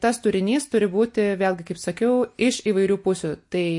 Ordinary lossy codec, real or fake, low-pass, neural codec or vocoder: MP3, 48 kbps; real; 10.8 kHz; none